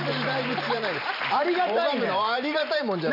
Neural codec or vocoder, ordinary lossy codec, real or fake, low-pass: vocoder, 44.1 kHz, 128 mel bands every 512 samples, BigVGAN v2; none; fake; 5.4 kHz